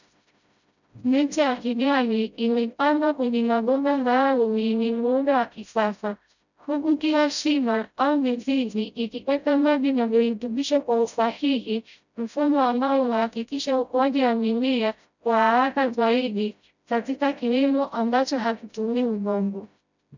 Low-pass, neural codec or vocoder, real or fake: 7.2 kHz; codec, 16 kHz, 0.5 kbps, FreqCodec, smaller model; fake